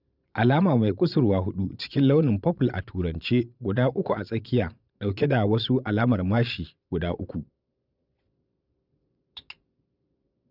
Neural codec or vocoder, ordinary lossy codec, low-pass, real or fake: vocoder, 22.05 kHz, 80 mel bands, Vocos; none; 5.4 kHz; fake